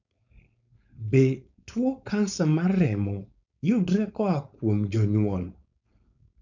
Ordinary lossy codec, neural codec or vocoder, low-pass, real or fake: none; codec, 16 kHz, 4.8 kbps, FACodec; 7.2 kHz; fake